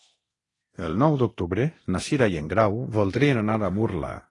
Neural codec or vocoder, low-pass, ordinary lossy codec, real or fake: codec, 24 kHz, 0.9 kbps, DualCodec; 10.8 kHz; AAC, 32 kbps; fake